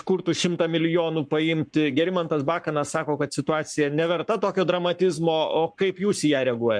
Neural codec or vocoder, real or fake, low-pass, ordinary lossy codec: codec, 44.1 kHz, 7.8 kbps, Pupu-Codec; fake; 9.9 kHz; MP3, 64 kbps